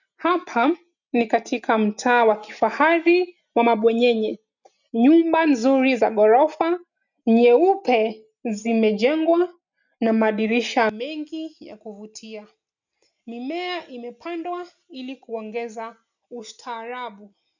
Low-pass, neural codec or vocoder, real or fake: 7.2 kHz; none; real